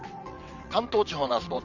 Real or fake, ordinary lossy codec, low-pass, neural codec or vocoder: fake; none; 7.2 kHz; vocoder, 22.05 kHz, 80 mel bands, WaveNeXt